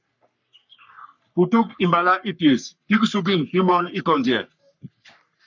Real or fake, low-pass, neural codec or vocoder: fake; 7.2 kHz; codec, 44.1 kHz, 3.4 kbps, Pupu-Codec